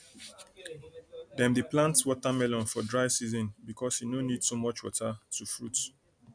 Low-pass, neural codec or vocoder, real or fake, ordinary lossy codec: 9.9 kHz; none; real; none